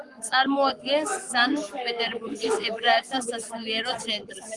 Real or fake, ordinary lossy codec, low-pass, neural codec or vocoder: fake; Opus, 24 kbps; 10.8 kHz; codec, 44.1 kHz, 7.8 kbps, Pupu-Codec